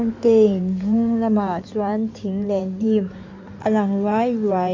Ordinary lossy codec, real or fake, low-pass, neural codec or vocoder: MP3, 64 kbps; fake; 7.2 kHz; codec, 16 kHz in and 24 kHz out, 2.2 kbps, FireRedTTS-2 codec